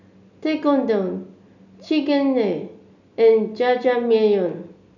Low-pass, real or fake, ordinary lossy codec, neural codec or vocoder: 7.2 kHz; real; none; none